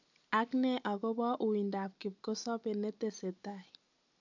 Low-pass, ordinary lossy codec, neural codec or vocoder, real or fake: 7.2 kHz; none; none; real